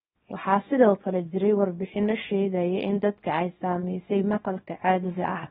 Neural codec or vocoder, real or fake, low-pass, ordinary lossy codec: codec, 24 kHz, 0.9 kbps, WavTokenizer, small release; fake; 10.8 kHz; AAC, 16 kbps